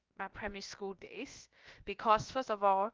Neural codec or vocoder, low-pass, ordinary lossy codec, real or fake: codec, 16 kHz, about 1 kbps, DyCAST, with the encoder's durations; 7.2 kHz; Opus, 32 kbps; fake